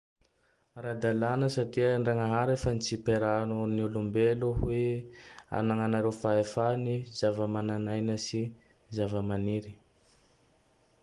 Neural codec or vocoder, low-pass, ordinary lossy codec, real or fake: none; 10.8 kHz; Opus, 24 kbps; real